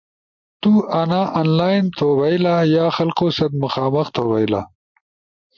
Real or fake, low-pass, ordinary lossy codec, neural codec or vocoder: real; 7.2 kHz; MP3, 48 kbps; none